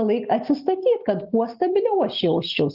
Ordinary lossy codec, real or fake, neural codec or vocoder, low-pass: Opus, 32 kbps; real; none; 5.4 kHz